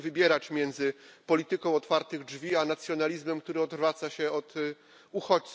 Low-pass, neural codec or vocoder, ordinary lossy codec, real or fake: none; none; none; real